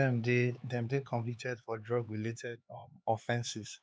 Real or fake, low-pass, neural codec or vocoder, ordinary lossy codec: fake; none; codec, 16 kHz, 4 kbps, X-Codec, HuBERT features, trained on LibriSpeech; none